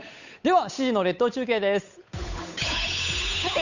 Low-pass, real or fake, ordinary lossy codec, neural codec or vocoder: 7.2 kHz; fake; none; codec, 16 kHz, 8 kbps, FunCodec, trained on Chinese and English, 25 frames a second